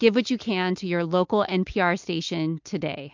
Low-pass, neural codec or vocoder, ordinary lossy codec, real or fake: 7.2 kHz; none; MP3, 64 kbps; real